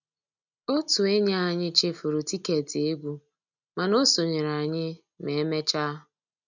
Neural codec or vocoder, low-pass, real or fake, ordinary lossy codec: none; 7.2 kHz; real; none